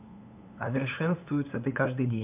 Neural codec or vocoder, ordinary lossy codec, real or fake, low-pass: codec, 16 kHz, 8 kbps, FunCodec, trained on LibriTTS, 25 frames a second; MP3, 32 kbps; fake; 3.6 kHz